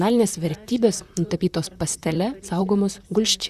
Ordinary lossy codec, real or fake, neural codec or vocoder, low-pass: Opus, 64 kbps; fake; vocoder, 44.1 kHz, 128 mel bands, Pupu-Vocoder; 14.4 kHz